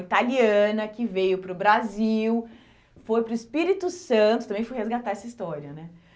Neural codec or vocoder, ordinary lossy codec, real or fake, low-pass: none; none; real; none